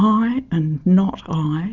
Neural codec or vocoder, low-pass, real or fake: none; 7.2 kHz; real